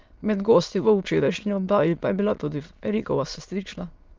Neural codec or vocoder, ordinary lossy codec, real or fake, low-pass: autoencoder, 22.05 kHz, a latent of 192 numbers a frame, VITS, trained on many speakers; Opus, 24 kbps; fake; 7.2 kHz